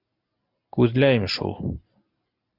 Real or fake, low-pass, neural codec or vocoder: real; 5.4 kHz; none